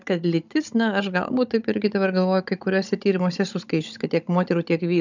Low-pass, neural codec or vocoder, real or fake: 7.2 kHz; codec, 16 kHz, 16 kbps, FunCodec, trained on Chinese and English, 50 frames a second; fake